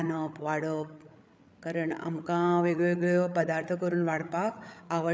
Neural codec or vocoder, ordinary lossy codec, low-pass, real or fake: codec, 16 kHz, 8 kbps, FreqCodec, larger model; none; none; fake